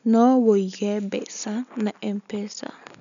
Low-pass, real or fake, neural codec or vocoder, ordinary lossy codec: 7.2 kHz; real; none; none